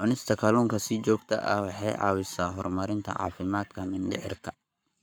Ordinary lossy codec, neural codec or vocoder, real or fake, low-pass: none; codec, 44.1 kHz, 7.8 kbps, Pupu-Codec; fake; none